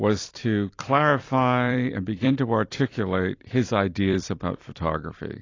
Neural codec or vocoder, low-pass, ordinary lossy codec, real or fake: vocoder, 44.1 kHz, 128 mel bands every 256 samples, BigVGAN v2; 7.2 kHz; AAC, 32 kbps; fake